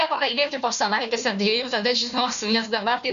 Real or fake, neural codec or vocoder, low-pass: fake; codec, 16 kHz, 1 kbps, FunCodec, trained on Chinese and English, 50 frames a second; 7.2 kHz